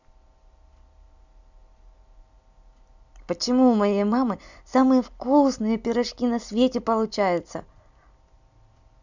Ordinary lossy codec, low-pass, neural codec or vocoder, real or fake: none; 7.2 kHz; none; real